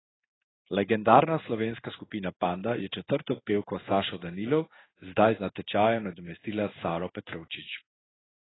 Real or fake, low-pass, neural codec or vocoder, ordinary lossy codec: fake; 7.2 kHz; codec, 16 kHz in and 24 kHz out, 1 kbps, XY-Tokenizer; AAC, 16 kbps